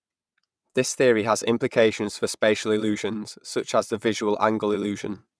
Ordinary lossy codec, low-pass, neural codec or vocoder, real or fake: none; none; vocoder, 22.05 kHz, 80 mel bands, WaveNeXt; fake